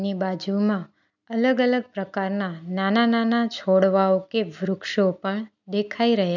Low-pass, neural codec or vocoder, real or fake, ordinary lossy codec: 7.2 kHz; none; real; none